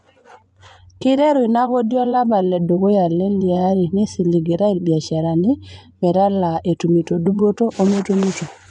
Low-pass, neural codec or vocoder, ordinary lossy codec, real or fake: 10.8 kHz; vocoder, 24 kHz, 100 mel bands, Vocos; none; fake